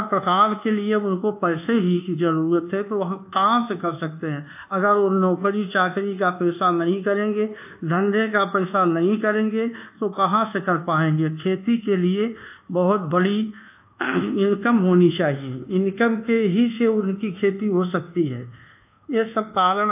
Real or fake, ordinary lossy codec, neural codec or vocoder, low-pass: fake; AAC, 32 kbps; codec, 24 kHz, 1.2 kbps, DualCodec; 3.6 kHz